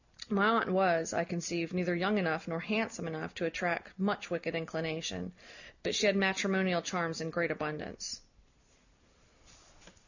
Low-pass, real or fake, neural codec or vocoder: 7.2 kHz; real; none